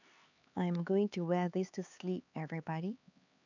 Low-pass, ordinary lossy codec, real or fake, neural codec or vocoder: 7.2 kHz; none; fake; codec, 16 kHz, 4 kbps, X-Codec, HuBERT features, trained on LibriSpeech